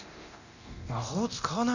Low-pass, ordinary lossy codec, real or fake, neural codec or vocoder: 7.2 kHz; none; fake; codec, 24 kHz, 0.9 kbps, DualCodec